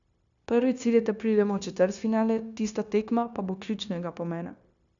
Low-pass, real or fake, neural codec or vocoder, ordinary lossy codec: 7.2 kHz; fake; codec, 16 kHz, 0.9 kbps, LongCat-Audio-Codec; none